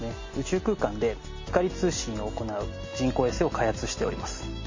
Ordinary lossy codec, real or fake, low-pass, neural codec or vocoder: MP3, 32 kbps; real; 7.2 kHz; none